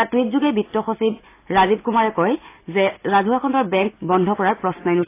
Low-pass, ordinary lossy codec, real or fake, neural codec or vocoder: 3.6 kHz; AAC, 24 kbps; real; none